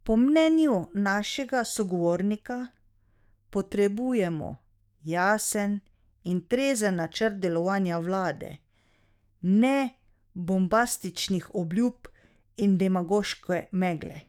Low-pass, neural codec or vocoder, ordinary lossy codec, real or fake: 19.8 kHz; codec, 44.1 kHz, 7.8 kbps, DAC; none; fake